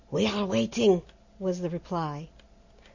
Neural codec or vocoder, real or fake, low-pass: none; real; 7.2 kHz